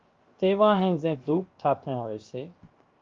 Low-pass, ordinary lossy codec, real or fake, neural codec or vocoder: 7.2 kHz; Opus, 32 kbps; fake; codec, 16 kHz, 0.7 kbps, FocalCodec